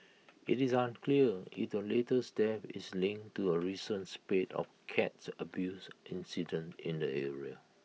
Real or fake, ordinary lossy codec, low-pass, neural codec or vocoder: real; none; none; none